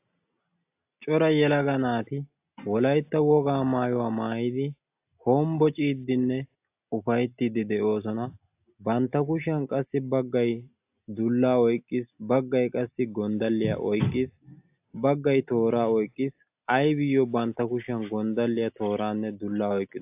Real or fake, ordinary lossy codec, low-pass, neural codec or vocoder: real; AAC, 32 kbps; 3.6 kHz; none